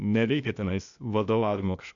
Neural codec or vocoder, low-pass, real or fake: codec, 16 kHz, 0.8 kbps, ZipCodec; 7.2 kHz; fake